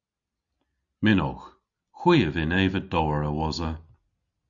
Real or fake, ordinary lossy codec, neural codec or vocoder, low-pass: real; Opus, 64 kbps; none; 7.2 kHz